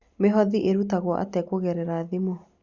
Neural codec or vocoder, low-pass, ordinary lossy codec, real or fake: none; 7.2 kHz; Opus, 64 kbps; real